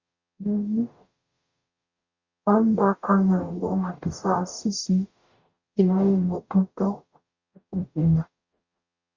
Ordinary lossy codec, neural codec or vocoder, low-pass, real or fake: Opus, 64 kbps; codec, 44.1 kHz, 0.9 kbps, DAC; 7.2 kHz; fake